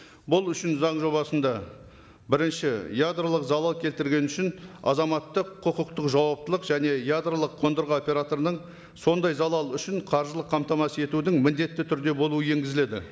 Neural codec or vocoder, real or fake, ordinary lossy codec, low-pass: none; real; none; none